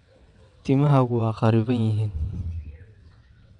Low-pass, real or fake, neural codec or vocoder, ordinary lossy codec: 9.9 kHz; fake; vocoder, 22.05 kHz, 80 mel bands, WaveNeXt; none